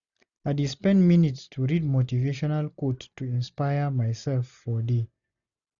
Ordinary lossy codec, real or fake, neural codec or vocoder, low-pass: none; real; none; 7.2 kHz